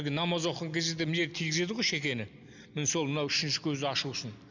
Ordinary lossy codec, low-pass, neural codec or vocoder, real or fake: none; 7.2 kHz; none; real